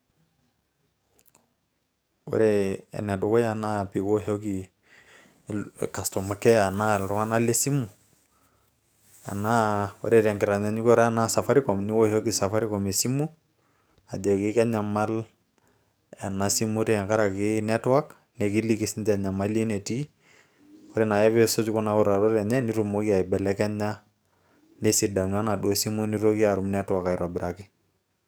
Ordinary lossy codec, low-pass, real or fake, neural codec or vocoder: none; none; fake; codec, 44.1 kHz, 7.8 kbps, DAC